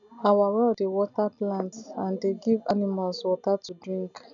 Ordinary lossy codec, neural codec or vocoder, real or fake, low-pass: none; none; real; 7.2 kHz